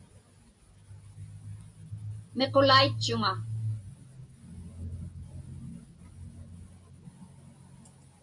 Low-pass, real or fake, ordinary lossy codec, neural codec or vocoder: 10.8 kHz; real; AAC, 64 kbps; none